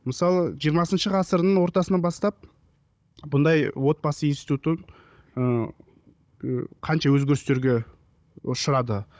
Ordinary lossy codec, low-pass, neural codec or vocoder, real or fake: none; none; codec, 16 kHz, 16 kbps, FunCodec, trained on Chinese and English, 50 frames a second; fake